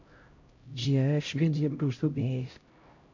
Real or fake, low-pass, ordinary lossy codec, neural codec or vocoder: fake; 7.2 kHz; MP3, 48 kbps; codec, 16 kHz, 0.5 kbps, X-Codec, HuBERT features, trained on LibriSpeech